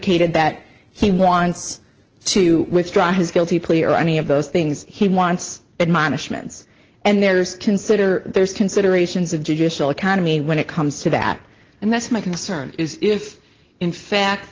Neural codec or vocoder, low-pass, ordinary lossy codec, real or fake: none; 7.2 kHz; Opus, 16 kbps; real